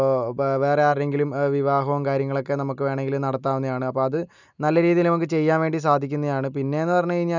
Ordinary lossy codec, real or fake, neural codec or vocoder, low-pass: none; real; none; 7.2 kHz